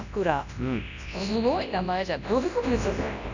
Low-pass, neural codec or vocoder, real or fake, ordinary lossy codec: 7.2 kHz; codec, 24 kHz, 0.9 kbps, WavTokenizer, large speech release; fake; none